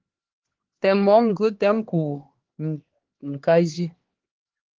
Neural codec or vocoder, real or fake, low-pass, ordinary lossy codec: codec, 16 kHz, 2 kbps, X-Codec, HuBERT features, trained on LibriSpeech; fake; 7.2 kHz; Opus, 16 kbps